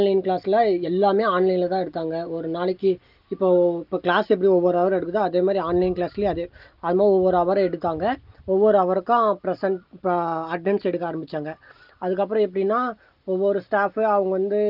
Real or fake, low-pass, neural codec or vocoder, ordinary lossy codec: real; 5.4 kHz; none; Opus, 32 kbps